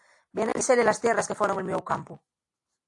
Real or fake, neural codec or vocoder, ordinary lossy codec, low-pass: real; none; AAC, 48 kbps; 10.8 kHz